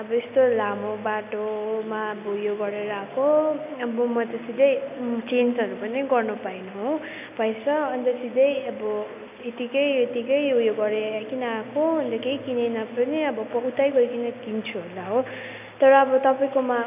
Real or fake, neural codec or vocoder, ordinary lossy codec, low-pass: real; none; none; 3.6 kHz